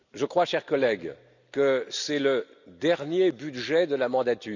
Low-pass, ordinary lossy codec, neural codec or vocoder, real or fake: 7.2 kHz; none; none; real